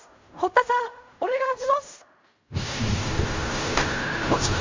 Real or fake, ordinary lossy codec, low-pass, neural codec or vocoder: fake; MP3, 64 kbps; 7.2 kHz; codec, 16 kHz in and 24 kHz out, 0.4 kbps, LongCat-Audio-Codec, fine tuned four codebook decoder